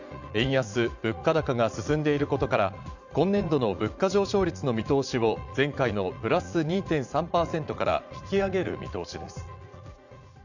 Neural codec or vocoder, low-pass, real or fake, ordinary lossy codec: vocoder, 44.1 kHz, 80 mel bands, Vocos; 7.2 kHz; fake; none